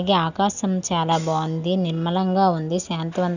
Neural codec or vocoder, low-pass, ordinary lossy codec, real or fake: none; 7.2 kHz; none; real